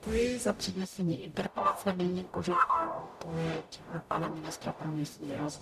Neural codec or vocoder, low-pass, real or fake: codec, 44.1 kHz, 0.9 kbps, DAC; 14.4 kHz; fake